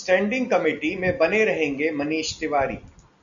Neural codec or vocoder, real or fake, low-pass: none; real; 7.2 kHz